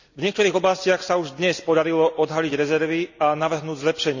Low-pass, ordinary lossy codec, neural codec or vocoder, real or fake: 7.2 kHz; none; none; real